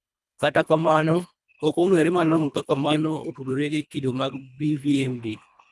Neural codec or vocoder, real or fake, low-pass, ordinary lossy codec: codec, 24 kHz, 1.5 kbps, HILCodec; fake; none; none